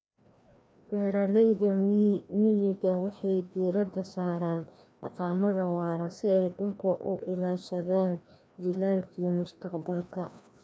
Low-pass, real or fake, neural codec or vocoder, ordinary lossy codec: none; fake; codec, 16 kHz, 1 kbps, FreqCodec, larger model; none